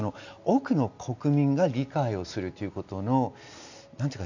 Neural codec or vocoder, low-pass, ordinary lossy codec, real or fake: none; 7.2 kHz; none; real